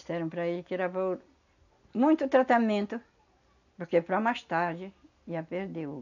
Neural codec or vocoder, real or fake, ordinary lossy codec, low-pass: none; real; none; 7.2 kHz